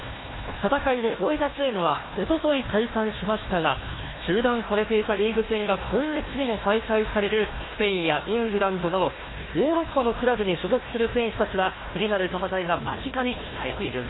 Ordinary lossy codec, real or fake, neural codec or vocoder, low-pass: AAC, 16 kbps; fake; codec, 16 kHz, 1 kbps, FunCodec, trained on Chinese and English, 50 frames a second; 7.2 kHz